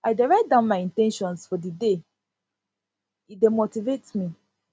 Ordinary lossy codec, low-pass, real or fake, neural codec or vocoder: none; none; real; none